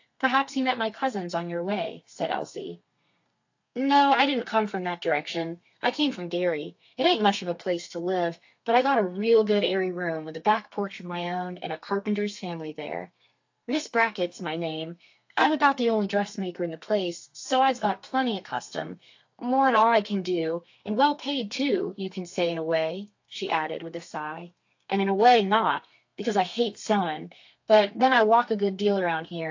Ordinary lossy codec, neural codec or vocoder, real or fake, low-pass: AAC, 48 kbps; codec, 32 kHz, 1.9 kbps, SNAC; fake; 7.2 kHz